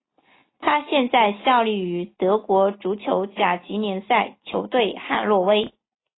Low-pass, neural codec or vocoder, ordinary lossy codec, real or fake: 7.2 kHz; none; AAC, 16 kbps; real